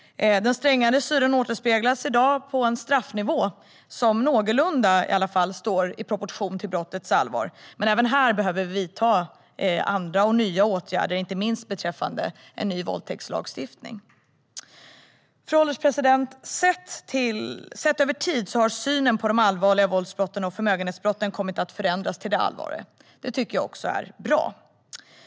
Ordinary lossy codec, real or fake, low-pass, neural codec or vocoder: none; real; none; none